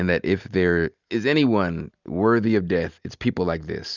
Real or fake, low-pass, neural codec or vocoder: real; 7.2 kHz; none